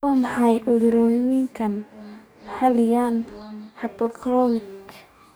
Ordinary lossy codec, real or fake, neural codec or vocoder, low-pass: none; fake; codec, 44.1 kHz, 2.6 kbps, DAC; none